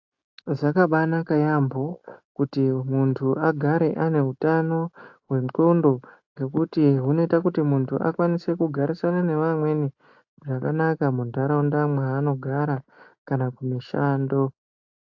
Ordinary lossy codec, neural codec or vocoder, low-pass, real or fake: AAC, 48 kbps; none; 7.2 kHz; real